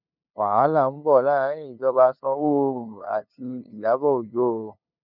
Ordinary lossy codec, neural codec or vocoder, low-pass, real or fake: none; codec, 16 kHz, 2 kbps, FunCodec, trained on LibriTTS, 25 frames a second; 5.4 kHz; fake